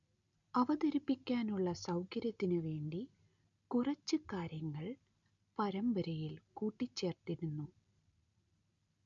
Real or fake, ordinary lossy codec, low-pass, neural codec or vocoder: real; none; 7.2 kHz; none